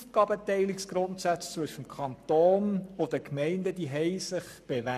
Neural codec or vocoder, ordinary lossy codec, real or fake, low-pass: codec, 44.1 kHz, 7.8 kbps, Pupu-Codec; none; fake; 14.4 kHz